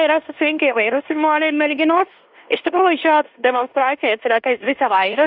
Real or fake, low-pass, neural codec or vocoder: fake; 5.4 kHz; codec, 16 kHz in and 24 kHz out, 0.9 kbps, LongCat-Audio-Codec, fine tuned four codebook decoder